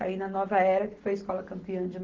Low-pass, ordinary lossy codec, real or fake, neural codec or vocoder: 7.2 kHz; Opus, 16 kbps; real; none